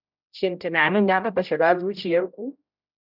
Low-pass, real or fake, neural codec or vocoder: 5.4 kHz; fake; codec, 16 kHz, 0.5 kbps, X-Codec, HuBERT features, trained on general audio